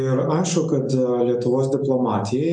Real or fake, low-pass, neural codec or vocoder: real; 9.9 kHz; none